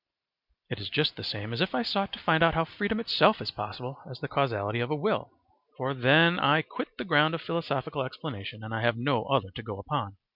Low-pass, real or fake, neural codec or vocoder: 5.4 kHz; real; none